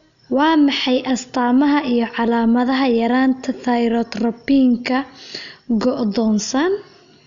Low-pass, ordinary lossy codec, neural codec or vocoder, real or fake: 7.2 kHz; Opus, 64 kbps; none; real